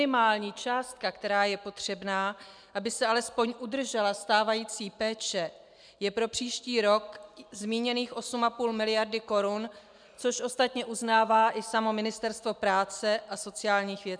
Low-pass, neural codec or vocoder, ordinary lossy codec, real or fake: 9.9 kHz; none; MP3, 96 kbps; real